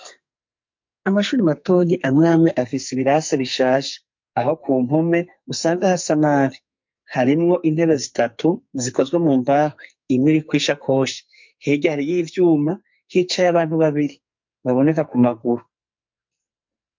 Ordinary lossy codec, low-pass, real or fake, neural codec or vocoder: MP3, 48 kbps; 7.2 kHz; fake; codec, 44.1 kHz, 2.6 kbps, SNAC